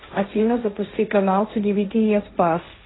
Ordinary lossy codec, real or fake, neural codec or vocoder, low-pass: AAC, 16 kbps; fake; codec, 16 kHz, 1.1 kbps, Voila-Tokenizer; 7.2 kHz